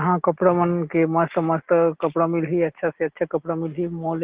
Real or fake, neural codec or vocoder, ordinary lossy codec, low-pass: real; none; Opus, 16 kbps; 3.6 kHz